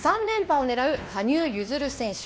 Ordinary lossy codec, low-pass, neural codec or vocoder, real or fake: none; none; codec, 16 kHz, 2 kbps, X-Codec, WavLM features, trained on Multilingual LibriSpeech; fake